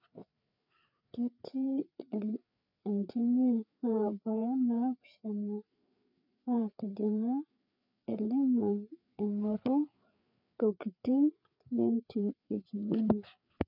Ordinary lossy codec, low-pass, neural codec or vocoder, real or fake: none; 5.4 kHz; codec, 16 kHz, 4 kbps, FreqCodec, larger model; fake